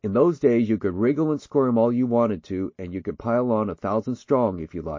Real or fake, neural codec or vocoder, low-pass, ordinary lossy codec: real; none; 7.2 kHz; MP3, 32 kbps